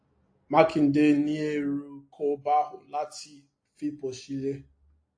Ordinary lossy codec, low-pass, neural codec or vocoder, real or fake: AAC, 48 kbps; 9.9 kHz; none; real